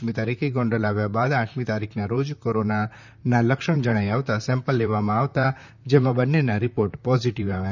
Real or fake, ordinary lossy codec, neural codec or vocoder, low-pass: fake; none; vocoder, 44.1 kHz, 128 mel bands, Pupu-Vocoder; 7.2 kHz